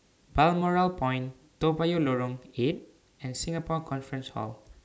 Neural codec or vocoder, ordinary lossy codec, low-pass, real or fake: none; none; none; real